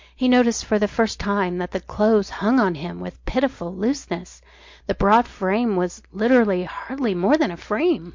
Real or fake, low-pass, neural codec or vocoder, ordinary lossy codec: real; 7.2 kHz; none; MP3, 48 kbps